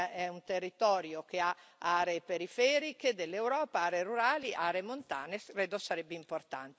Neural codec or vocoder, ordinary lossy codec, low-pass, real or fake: none; none; none; real